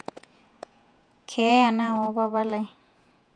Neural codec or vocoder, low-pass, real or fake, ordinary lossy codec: vocoder, 48 kHz, 128 mel bands, Vocos; 9.9 kHz; fake; none